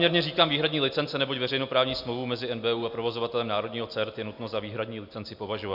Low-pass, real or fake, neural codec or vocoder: 5.4 kHz; real; none